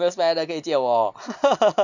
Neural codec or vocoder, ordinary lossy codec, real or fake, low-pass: none; none; real; 7.2 kHz